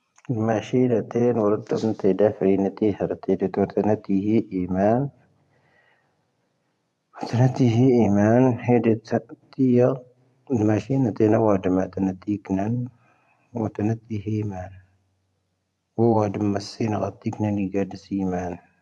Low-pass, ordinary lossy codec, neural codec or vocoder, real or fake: none; none; vocoder, 24 kHz, 100 mel bands, Vocos; fake